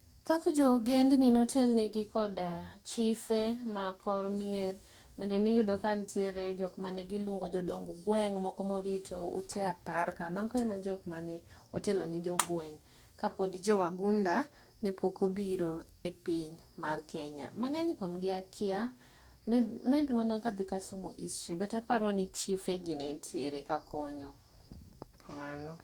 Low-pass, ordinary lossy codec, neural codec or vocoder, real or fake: 19.8 kHz; Opus, 64 kbps; codec, 44.1 kHz, 2.6 kbps, DAC; fake